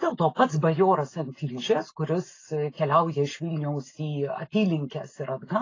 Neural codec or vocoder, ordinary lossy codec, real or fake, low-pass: none; AAC, 32 kbps; real; 7.2 kHz